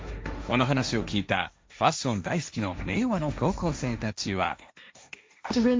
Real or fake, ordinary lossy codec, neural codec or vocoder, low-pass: fake; none; codec, 16 kHz, 1.1 kbps, Voila-Tokenizer; none